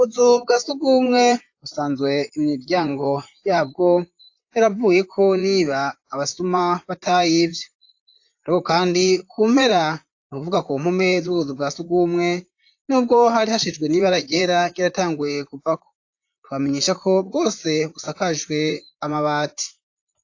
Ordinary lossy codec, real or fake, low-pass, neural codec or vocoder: AAC, 48 kbps; fake; 7.2 kHz; vocoder, 44.1 kHz, 128 mel bands, Pupu-Vocoder